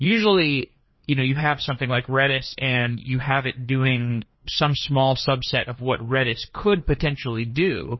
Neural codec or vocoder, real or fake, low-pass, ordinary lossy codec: codec, 16 kHz, 2 kbps, FreqCodec, larger model; fake; 7.2 kHz; MP3, 24 kbps